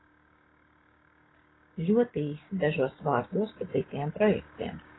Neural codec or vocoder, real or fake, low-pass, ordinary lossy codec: codec, 16 kHz, 6 kbps, DAC; fake; 7.2 kHz; AAC, 16 kbps